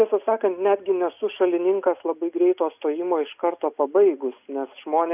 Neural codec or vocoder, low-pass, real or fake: none; 3.6 kHz; real